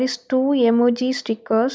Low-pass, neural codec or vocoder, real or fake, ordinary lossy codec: none; none; real; none